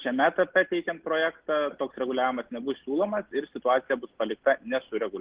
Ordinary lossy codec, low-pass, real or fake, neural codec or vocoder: Opus, 16 kbps; 3.6 kHz; real; none